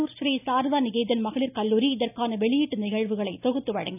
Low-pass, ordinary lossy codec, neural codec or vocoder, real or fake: 3.6 kHz; none; none; real